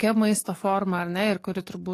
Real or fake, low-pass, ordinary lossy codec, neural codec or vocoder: fake; 14.4 kHz; AAC, 48 kbps; codec, 44.1 kHz, 7.8 kbps, DAC